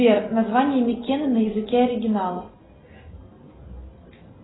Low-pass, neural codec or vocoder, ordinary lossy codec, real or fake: 7.2 kHz; none; AAC, 16 kbps; real